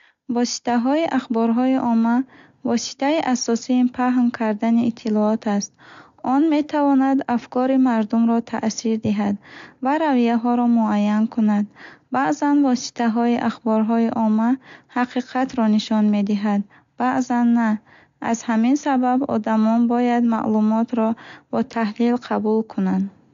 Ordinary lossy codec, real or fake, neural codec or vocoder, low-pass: AAC, 64 kbps; real; none; 7.2 kHz